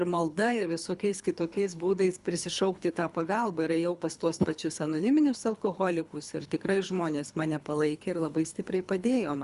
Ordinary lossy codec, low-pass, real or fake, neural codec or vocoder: Opus, 64 kbps; 10.8 kHz; fake; codec, 24 kHz, 3 kbps, HILCodec